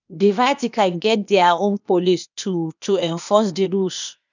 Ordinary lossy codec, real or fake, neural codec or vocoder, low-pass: none; fake; codec, 16 kHz, 0.8 kbps, ZipCodec; 7.2 kHz